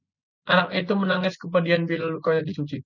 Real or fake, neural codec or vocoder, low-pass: real; none; 7.2 kHz